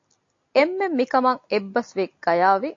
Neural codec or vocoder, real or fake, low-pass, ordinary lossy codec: none; real; 7.2 kHz; AAC, 48 kbps